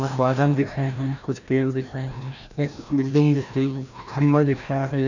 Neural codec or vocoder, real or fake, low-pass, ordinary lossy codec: codec, 16 kHz, 1 kbps, FreqCodec, larger model; fake; 7.2 kHz; none